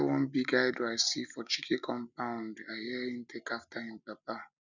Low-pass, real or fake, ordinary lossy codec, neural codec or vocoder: 7.2 kHz; real; none; none